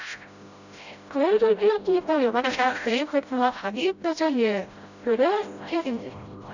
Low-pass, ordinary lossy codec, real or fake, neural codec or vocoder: 7.2 kHz; none; fake; codec, 16 kHz, 0.5 kbps, FreqCodec, smaller model